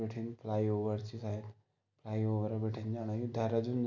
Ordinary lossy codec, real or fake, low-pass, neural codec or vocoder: none; real; 7.2 kHz; none